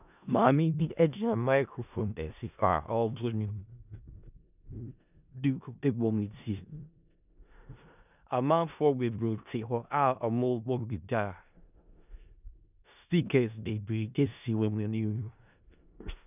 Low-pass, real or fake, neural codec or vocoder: 3.6 kHz; fake; codec, 16 kHz in and 24 kHz out, 0.4 kbps, LongCat-Audio-Codec, four codebook decoder